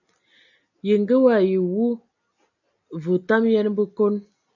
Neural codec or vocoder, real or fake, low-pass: none; real; 7.2 kHz